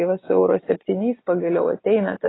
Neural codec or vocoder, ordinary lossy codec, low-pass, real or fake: none; AAC, 16 kbps; 7.2 kHz; real